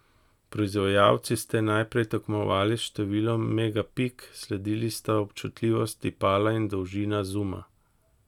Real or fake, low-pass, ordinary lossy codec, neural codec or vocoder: real; 19.8 kHz; none; none